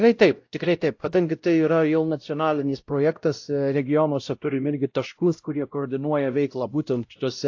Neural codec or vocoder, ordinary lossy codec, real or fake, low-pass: codec, 16 kHz, 0.5 kbps, X-Codec, WavLM features, trained on Multilingual LibriSpeech; AAC, 48 kbps; fake; 7.2 kHz